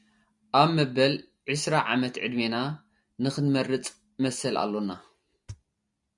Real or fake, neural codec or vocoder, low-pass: real; none; 10.8 kHz